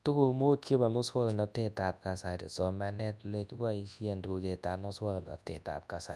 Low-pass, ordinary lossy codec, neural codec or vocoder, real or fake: none; none; codec, 24 kHz, 0.9 kbps, WavTokenizer, large speech release; fake